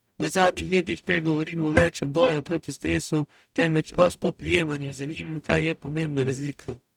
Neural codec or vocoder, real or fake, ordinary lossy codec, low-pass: codec, 44.1 kHz, 0.9 kbps, DAC; fake; none; 19.8 kHz